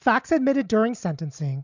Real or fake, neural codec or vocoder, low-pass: real; none; 7.2 kHz